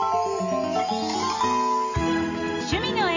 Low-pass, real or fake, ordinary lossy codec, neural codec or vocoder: 7.2 kHz; real; none; none